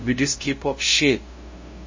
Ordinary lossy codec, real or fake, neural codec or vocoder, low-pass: MP3, 32 kbps; fake; codec, 16 kHz, 0.5 kbps, FunCodec, trained on LibriTTS, 25 frames a second; 7.2 kHz